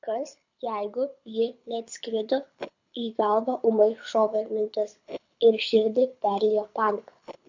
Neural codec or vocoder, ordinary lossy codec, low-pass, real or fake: codec, 24 kHz, 6 kbps, HILCodec; MP3, 48 kbps; 7.2 kHz; fake